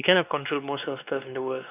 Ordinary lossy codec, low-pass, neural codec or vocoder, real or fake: none; 3.6 kHz; codec, 16 kHz, 2 kbps, X-Codec, WavLM features, trained on Multilingual LibriSpeech; fake